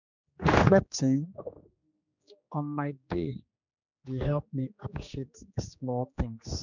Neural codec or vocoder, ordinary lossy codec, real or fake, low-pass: codec, 16 kHz, 2 kbps, X-Codec, HuBERT features, trained on general audio; none; fake; 7.2 kHz